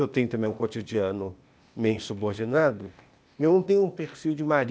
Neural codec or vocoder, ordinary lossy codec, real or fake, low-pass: codec, 16 kHz, 0.8 kbps, ZipCodec; none; fake; none